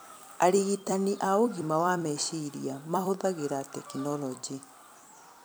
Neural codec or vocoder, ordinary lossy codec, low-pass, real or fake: vocoder, 44.1 kHz, 128 mel bands every 256 samples, BigVGAN v2; none; none; fake